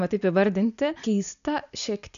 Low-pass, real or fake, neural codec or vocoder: 7.2 kHz; real; none